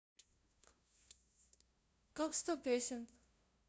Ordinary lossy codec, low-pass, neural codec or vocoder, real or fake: none; none; codec, 16 kHz, 0.5 kbps, FunCodec, trained on LibriTTS, 25 frames a second; fake